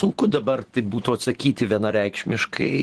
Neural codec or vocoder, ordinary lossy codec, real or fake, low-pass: vocoder, 24 kHz, 100 mel bands, Vocos; Opus, 16 kbps; fake; 10.8 kHz